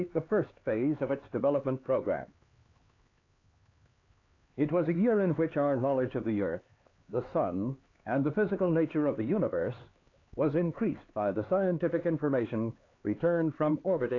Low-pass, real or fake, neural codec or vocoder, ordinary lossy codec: 7.2 kHz; fake; codec, 16 kHz, 4 kbps, X-Codec, HuBERT features, trained on LibriSpeech; AAC, 32 kbps